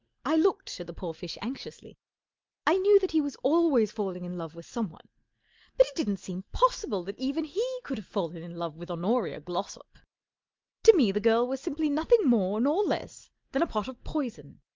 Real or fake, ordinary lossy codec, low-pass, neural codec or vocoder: real; Opus, 24 kbps; 7.2 kHz; none